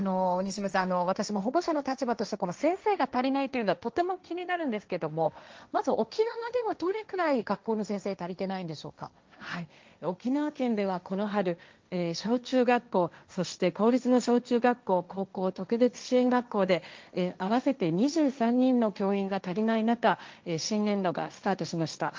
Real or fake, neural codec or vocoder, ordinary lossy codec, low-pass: fake; codec, 16 kHz, 1.1 kbps, Voila-Tokenizer; Opus, 24 kbps; 7.2 kHz